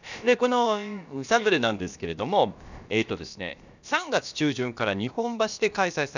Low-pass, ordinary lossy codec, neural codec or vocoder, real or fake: 7.2 kHz; none; codec, 16 kHz, about 1 kbps, DyCAST, with the encoder's durations; fake